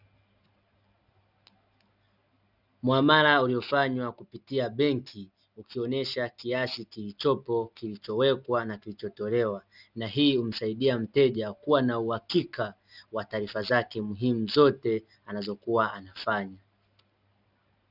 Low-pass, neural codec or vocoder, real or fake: 5.4 kHz; none; real